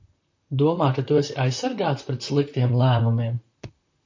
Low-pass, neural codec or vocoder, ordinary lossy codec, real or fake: 7.2 kHz; vocoder, 44.1 kHz, 128 mel bands, Pupu-Vocoder; AAC, 48 kbps; fake